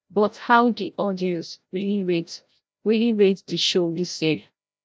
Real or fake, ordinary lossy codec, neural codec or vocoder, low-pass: fake; none; codec, 16 kHz, 0.5 kbps, FreqCodec, larger model; none